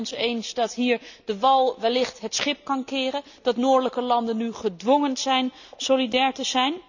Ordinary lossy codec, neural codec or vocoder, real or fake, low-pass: none; none; real; 7.2 kHz